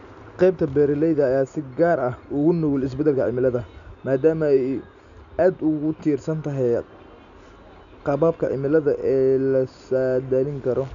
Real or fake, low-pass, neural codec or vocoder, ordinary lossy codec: real; 7.2 kHz; none; none